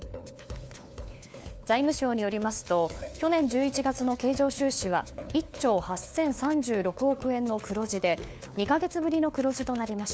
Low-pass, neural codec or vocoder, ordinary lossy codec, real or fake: none; codec, 16 kHz, 4 kbps, FunCodec, trained on LibriTTS, 50 frames a second; none; fake